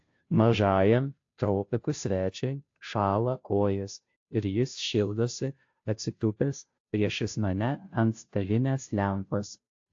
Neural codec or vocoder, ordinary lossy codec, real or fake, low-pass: codec, 16 kHz, 0.5 kbps, FunCodec, trained on Chinese and English, 25 frames a second; AAC, 48 kbps; fake; 7.2 kHz